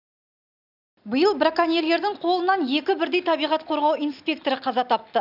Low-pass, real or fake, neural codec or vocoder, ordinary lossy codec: 5.4 kHz; real; none; none